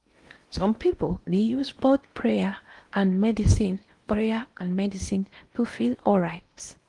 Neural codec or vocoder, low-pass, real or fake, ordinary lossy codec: codec, 16 kHz in and 24 kHz out, 0.8 kbps, FocalCodec, streaming, 65536 codes; 10.8 kHz; fake; Opus, 24 kbps